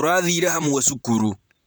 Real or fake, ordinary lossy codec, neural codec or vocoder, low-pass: fake; none; vocoder, 44.1 kHz, 128 mel bands, Pupu-Vocoder; none